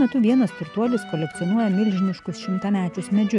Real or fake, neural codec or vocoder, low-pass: real; none; 10.8 kHz